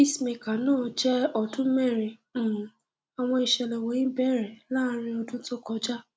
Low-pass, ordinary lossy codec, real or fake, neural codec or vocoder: none; none; real; none